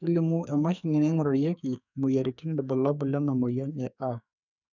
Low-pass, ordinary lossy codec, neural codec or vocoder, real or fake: 7.2 kHz; none; codec, 44.1 kHz, 2.6 kbps, SNAC; fake